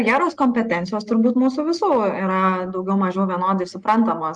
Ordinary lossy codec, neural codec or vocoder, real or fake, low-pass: Opus, 32 kbps; none; real; 10.8 kHz